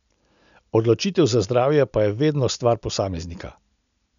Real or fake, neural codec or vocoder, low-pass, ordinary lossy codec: real; none; 7.2 kHz; none